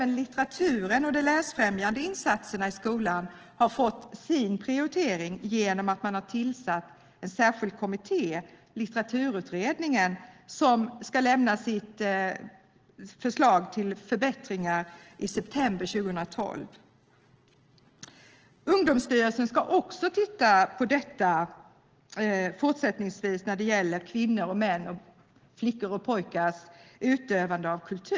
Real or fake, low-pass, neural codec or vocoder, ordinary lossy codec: real; 7.2 kHz; none; Opus, 16 kbps